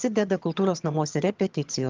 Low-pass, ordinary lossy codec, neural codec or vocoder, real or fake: 7.2 kHz; Opus, 32 kbps; vocoder, 22.05 kHz, 80 mel bands, HiFi-GAN; fake